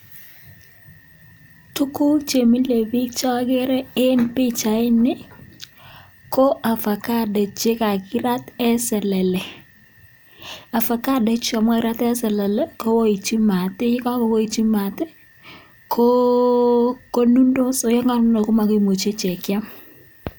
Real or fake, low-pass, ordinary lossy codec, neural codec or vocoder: real; none; none; none